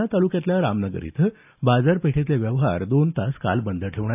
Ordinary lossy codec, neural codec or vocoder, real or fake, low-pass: AAC, 32 kbps; none; real; 3.6 kHz